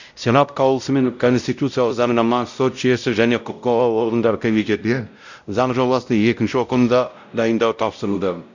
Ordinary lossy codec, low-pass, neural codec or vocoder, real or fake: none; 7.2 kHz; codec, 16 kHz, 0.5 kbps, X-Codec, WavLM features, trained on Multilingual LibriSpeech; fake